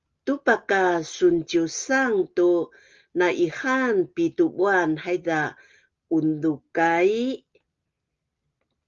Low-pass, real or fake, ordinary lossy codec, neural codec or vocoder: 7.2 kHz; real; Opus, 32 kbps; none